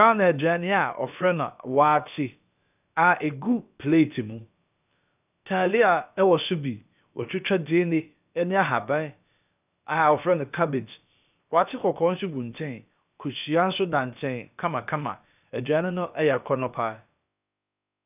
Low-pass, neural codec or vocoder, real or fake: 3.6 kHz; codec, 16 kHz, about 1 kbps, DyCAST, with the encoder's durations; fake